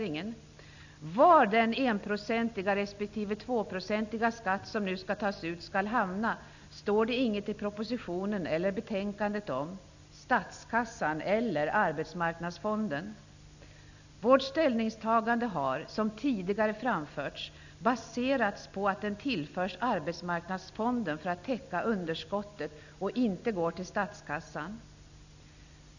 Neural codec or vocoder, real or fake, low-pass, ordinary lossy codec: none; real; 7.2 kHz; none